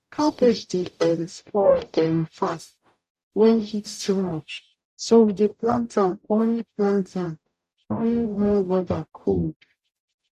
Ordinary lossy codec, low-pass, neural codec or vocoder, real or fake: AAC, 96 kbps; 14.4 kHz; codec, 44.1 kHz, 0.9 kbps, DAC; fake